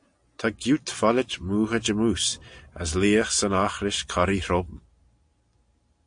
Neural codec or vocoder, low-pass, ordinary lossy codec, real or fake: none; 9.9 kHz; AAC, 64 kbps; real